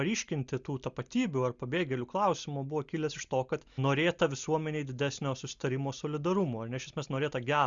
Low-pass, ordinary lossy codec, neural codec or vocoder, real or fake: 7.2 kHz; Opus, 64 kbps; none; real